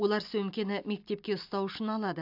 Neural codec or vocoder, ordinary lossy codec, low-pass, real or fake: none; AAC, 48 kbps; 5.4 kHz; real